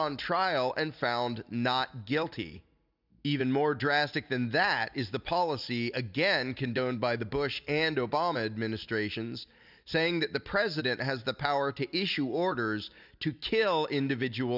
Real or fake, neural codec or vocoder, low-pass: real; none; 5.4 kHz